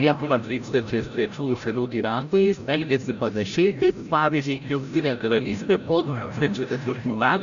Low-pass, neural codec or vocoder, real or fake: 7.2 kHz; codec, 16 kHz, 0.5 kbps, FreqCodec, larger model; fake